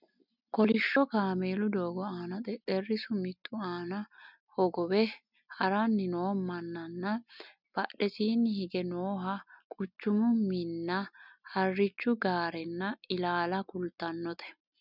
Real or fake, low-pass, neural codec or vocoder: real; 5.4 kHz; none